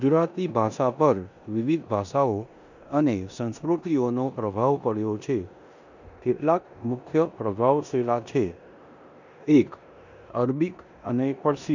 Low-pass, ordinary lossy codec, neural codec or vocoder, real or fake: 7.2 kHz; none; codec, 16 kHz in and 24 kHz out, 0.9 kbps, LongCat-Audio-Codec, four codebook decoder; fake